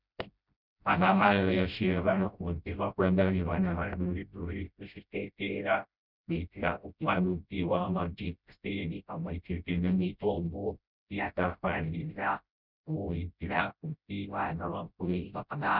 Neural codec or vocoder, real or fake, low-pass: codec, 16 kHz, 0.5 kbps, FreqCodec, smaller model; fake; 5.4 kHz